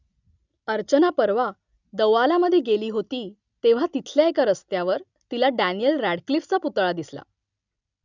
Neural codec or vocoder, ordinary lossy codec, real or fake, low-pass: none; none; real; 7.2 kHz